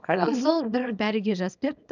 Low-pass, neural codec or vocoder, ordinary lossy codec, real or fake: 7.2 kHz; codec, 24 kHz, 0.9 kbps, WavTokenizer, small release; none; fake